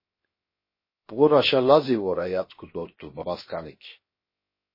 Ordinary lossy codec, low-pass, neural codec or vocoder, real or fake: MP3, 24 kbps; 5.4 kHz; codec, 16 kHz, 0.7 kbps, FocalCodec; fake